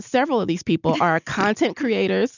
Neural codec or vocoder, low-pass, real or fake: none; 7.2 kHz; real